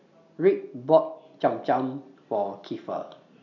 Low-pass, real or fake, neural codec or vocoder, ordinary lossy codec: 7.2 kHz; real; none; none